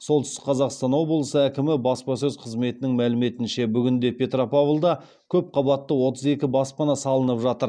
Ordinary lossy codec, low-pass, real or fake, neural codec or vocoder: none; 9.9 kHz; real; none